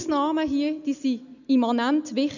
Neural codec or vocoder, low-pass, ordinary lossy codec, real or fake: none; 7.2 kHz; none; real